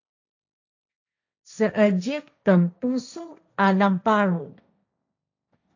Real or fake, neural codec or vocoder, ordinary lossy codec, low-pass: fake; codec, 16 kHz, 1.1 kbps, Voila-Tokenizer; AAC, 48 kbps; 7.2 kHz